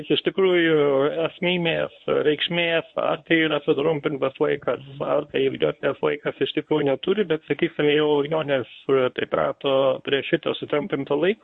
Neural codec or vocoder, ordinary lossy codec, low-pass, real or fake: codec, 24 kHz, 0.9 kbps, WavTokenizer, medium speech release version 1; MP3, 48 kbps; 10.8 kHz; fake